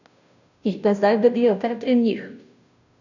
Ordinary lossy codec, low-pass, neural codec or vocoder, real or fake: none; 7.2 kHz; codec, 16 kHz, 0.5 kbps, FunCodec, trained on Chinese and English, 25 frames a second; fake